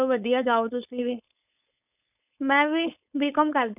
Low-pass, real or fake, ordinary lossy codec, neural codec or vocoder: 3.6 kHz; fake; none; codec, 16 kHz, 4.8 kbps, FACodec